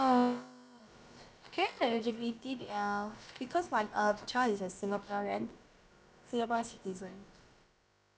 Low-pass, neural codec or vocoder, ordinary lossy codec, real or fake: none; codec, 16 kHz, about 1 kbps, DyCAST, with the encoder's durations; none; fake